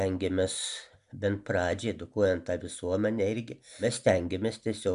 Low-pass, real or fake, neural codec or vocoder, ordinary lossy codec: 10.8 kHz; real; none; MP3, 96 kbps